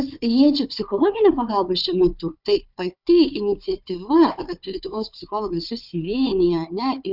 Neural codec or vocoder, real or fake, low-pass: codec, 16 kHz, 4 kbps, FunCodec, trained on Chinese and English, 50 frames a second; fake; 5.4 kHz